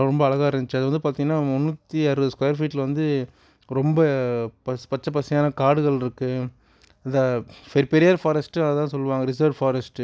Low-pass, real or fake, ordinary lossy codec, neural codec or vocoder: none; real; none; none